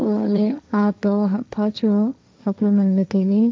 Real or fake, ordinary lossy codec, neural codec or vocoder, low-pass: fake; none; codec, 16 kHz, 1.1 kbps, Voila-Tokenizer; none